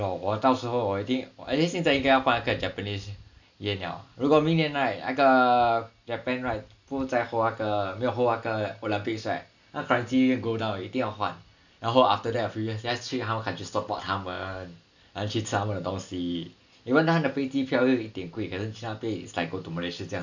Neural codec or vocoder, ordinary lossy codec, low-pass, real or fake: none; none; 7.2 kHz; real